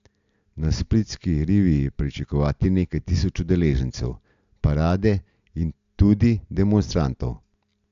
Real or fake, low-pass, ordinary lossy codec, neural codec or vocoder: real; 7.2 kHz; AAC, 64 kbps; none